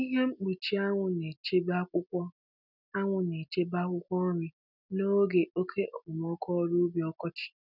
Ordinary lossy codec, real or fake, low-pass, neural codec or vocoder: none; real; 5.4 kHz; none